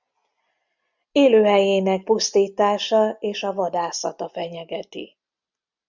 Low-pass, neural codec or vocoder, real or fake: 7.2 kHz; none; real